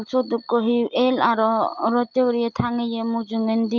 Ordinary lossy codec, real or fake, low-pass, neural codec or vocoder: Opus, 32 kbps; real; 7.2 kHz; none